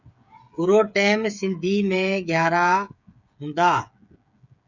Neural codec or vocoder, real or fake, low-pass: codec, 16 kHz, 8 kbps, FreqCodec, smaller model; fake; 7.2 kHz